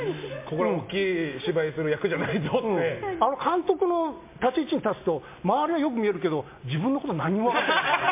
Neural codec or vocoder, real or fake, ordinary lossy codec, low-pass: none; real; MP3, 24 kbps; 3.6 kHz